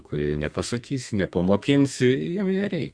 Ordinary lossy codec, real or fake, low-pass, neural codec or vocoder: Opus, 64 kbps; fake; 9.9 kHz; codec, 32 kHz, 1.9 kbps, SNAC